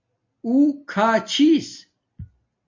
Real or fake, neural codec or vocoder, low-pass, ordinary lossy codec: real; none; 7.2 kHz; MP3, 48 kbps